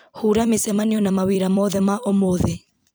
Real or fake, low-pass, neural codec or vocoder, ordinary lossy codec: fake; none; vocoder, 44.1 kHz, 128 mel bands every 256 samples, BigVGAN v2; none